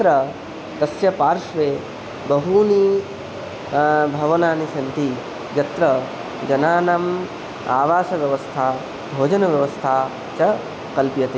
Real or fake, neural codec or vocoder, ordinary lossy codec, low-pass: real; none; none; none